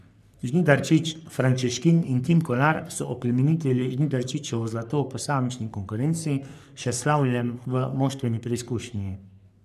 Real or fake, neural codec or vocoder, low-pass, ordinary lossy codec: fake; codec, 44.1 kHz, 3.4 kbps, Pupu-Codec; 14.4 kHz; none